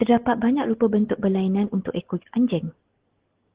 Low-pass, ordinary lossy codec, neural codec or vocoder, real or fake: 3.6 kHz; Opus, 16 kbps; none; real